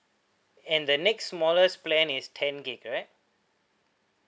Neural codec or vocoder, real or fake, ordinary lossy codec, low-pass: none; real; none; none